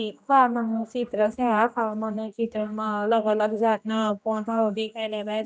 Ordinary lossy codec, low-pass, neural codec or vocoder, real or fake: none; none; codec, 16 kHz, 1 kbps, X-Codec, HuBERT features, trained on general audio; fake